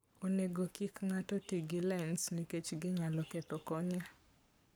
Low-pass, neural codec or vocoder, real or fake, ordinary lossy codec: none; codec, 44.1 kHz, 7.8 kbps, Pupu-Codec; fake; none